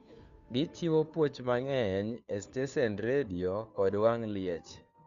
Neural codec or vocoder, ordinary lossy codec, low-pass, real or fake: codec, 16 kHz, 2 kbps, FunCodec, trained on Chinese and English, 25 frames a second; none; 7.2 kHz; fake